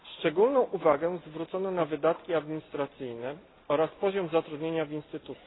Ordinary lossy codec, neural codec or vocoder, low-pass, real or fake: AAC, 16 kbps; none; 7.2 kHz; real